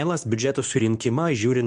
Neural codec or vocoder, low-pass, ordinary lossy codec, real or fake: codec, 24 kHz, 0.9 kbps, WavTokenizer, medium speech release version 2; 10.8 kHz; MP3, 64 kbps; fake